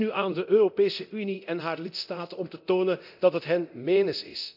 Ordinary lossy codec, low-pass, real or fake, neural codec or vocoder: none; 5.4 kHz; fake; codec, 24 kHz, 0.9 kbps, DualCodec